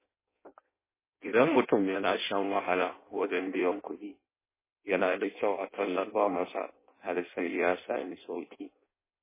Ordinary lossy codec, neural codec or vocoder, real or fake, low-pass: MP3, 16 kbps; codec, 16 kHz in and 24 kHz out, 0.6 kbps, FireRedTTS-2 codec; fake; 3.6 kHz